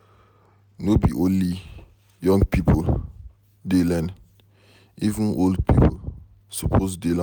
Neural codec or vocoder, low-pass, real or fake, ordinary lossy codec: none; none; real; none